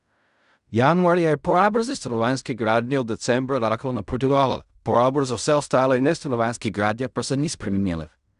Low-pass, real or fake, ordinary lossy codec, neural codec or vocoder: 10.8 kHz; fake; none; codec, 16 kHz in and 24 kHz out, 0.4 kbps, LongCat-Audio-Codec, fine tuned four codebook decoder